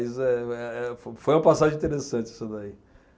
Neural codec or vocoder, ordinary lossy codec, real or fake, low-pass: none; none; real; none